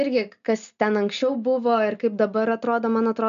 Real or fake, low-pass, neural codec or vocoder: real; 7.2 kHz; none